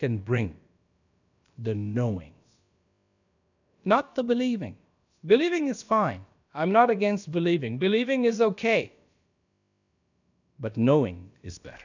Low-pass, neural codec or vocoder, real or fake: 7.2 kHz; codec, 16 kHz, about 1 kbps, DyCAST, with the encoder's durations; fake